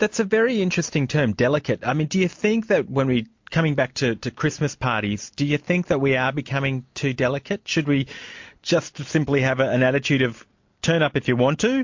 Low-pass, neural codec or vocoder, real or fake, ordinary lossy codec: 7.2 kHz; none; real; MP3, 48 kbps